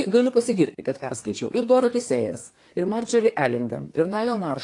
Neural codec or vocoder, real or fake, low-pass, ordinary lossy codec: codec, 24 kHz, 1 kbps, SNAC; fake; 10.8 kHz; AAC, 48 kbps